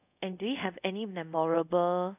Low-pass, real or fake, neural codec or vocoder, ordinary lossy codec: 3.6 kHz; fake; codec, 24 kHz, 0.5 kbps, DualCodec; none